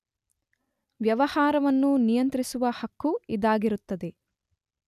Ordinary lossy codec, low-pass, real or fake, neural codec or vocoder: none; 14.4 kHz; real; none